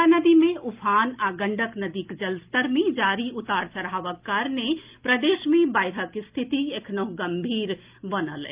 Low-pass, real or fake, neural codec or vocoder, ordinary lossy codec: 3.6 kHz; real; none; Opus, 32 kbps